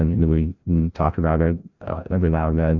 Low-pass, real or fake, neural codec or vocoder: 7.2 kHz; fake; codec, 16 kHz, 0.5 kbps, FreqCodec, larger model